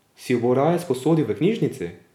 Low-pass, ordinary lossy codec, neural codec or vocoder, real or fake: 19.8 kHz; none; none; real